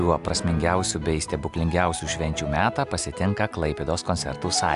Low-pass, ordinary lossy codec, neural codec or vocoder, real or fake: 10.8 kHz; AAC, 96 kbps; none; real